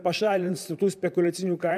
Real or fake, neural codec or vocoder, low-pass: fake; vocoder, 44.1 kHz, 128 mel bands, Pupu-Vocoder; 14.4 kHz